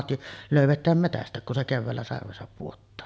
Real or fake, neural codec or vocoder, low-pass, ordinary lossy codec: real; none; none; none